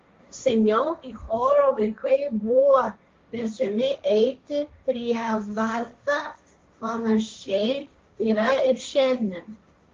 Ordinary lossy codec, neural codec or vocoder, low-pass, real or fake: Opus, 32 kbps; codec, 16 kHz, 1.1 kbps, Voila-Tokenizer; 7.2 kHz; fake